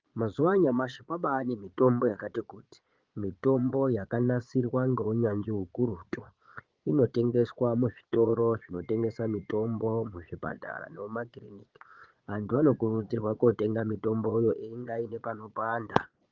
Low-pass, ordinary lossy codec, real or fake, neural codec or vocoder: 7.2 kHz; Opus, 32 kbps; fake; vocoder, 22.05 kHz, 80 mel bands, Vocos